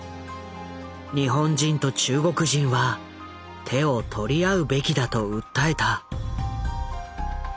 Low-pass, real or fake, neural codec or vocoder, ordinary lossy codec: none; real; none; none